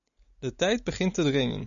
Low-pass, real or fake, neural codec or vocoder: 7.2 kHz; real; none